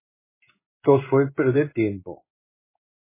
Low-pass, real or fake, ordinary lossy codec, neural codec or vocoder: 3.6 kHz; fake; MP3, 16 kbps; codec, 16 kHz in and 24 kHz out, 1 kbps, XY-Tokenizer